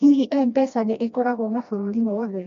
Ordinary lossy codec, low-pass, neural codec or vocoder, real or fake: MP3, 48 kbps; 7.2 kHz; codec, 16 kHz, 1 kbps, FreqCodec, smaller model; fake